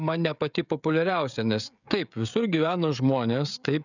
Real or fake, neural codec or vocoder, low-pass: fake; codec, 16 kHz, 16 kbps, FreqCodec, smaller model; 7.2 kHz